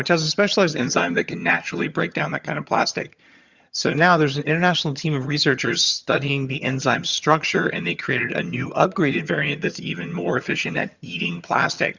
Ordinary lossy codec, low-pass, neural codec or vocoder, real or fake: Opus, 64 kbps; 7.2 kHz; vocoder, 22.05 kHz, 80 mel bands, HiFi-GAN; fake